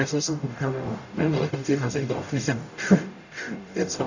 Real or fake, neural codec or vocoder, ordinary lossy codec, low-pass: fake; codec, 44.1 kHz, 0.9 kbps, DAC; none; 7.2 kHz